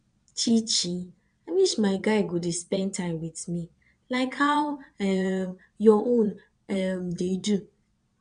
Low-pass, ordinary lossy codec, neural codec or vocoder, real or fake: 9.9 kHz; none; vocoder, 22.05 kHz, 80 mel bands, Vocos; fake